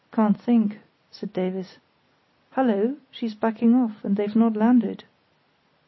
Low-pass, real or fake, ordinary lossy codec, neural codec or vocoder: 7.2 kHz; real; MP3, 24 kbps; none